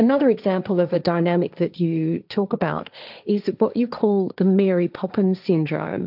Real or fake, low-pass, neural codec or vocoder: fake; 5.4 kHz; codec, 16 kHz, 1.1 kbps, Voila-Tokenizer